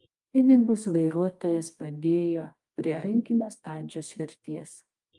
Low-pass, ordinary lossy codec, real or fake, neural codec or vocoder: 10.8 kHz; Opus, 32 kbps; fake; codec, 24 kHz, 0.9 kbps, WavTokenizer, medium music audio release